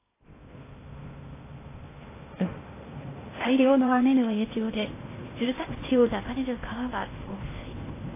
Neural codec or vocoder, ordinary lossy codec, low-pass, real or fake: codec, 16 kHz in and 24 kHz out, 0.6 kbps, FocalCodec, streaming, 2048 codes; AAC, 16 kbps; 3.6 kHz; fake